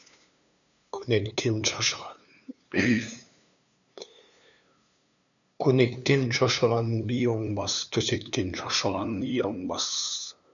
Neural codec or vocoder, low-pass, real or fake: codec, 16 kHz, 2 kbps, FunCodec, trained on LibriTTS, 25 frames a second; 7.2 kHz; fake